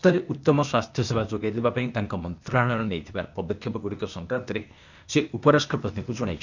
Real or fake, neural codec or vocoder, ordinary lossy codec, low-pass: fake; codec, 16 kHz, 0.8 kbps, ZipCodec; none; 7.2 kHz